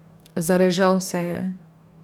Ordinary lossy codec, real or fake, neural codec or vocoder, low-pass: none; fake; codec, 44.1 kHz, 2.6 kbps, DAC; 19.8 kHz